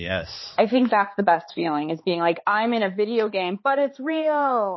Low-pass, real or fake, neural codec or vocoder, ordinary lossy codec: 7.2 kHz; fake; codec, 16 kHz, 4 kbps, X-Codec, HuBERT features, trained on balanced general audio; MP3, 24 kbps